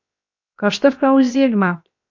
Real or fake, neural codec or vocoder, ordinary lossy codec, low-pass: fake; codec, 16 kHz, 0.7 kbps, FocalCodec; MP3, 48 kbps; 7.2 kHz